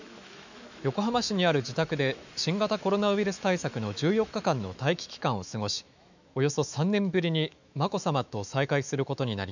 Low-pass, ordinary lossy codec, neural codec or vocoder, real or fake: 7.2 kHz; none; autoencoder, 48 kHz, 128 numbers a frame, DAC-VAE, trained on Japanese speech; fake